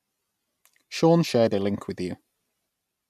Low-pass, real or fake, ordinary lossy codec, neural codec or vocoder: 14.4 kHz; real; none; none